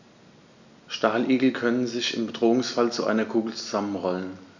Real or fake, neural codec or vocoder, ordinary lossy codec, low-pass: real; none; none; 7.2 kHz